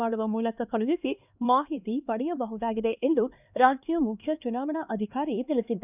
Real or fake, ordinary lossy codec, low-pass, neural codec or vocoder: fake; none; 3.6 kHz; codec, 16 kHz, 2 kbps, X-Codec, HuBERT features, trained on LibriSpeech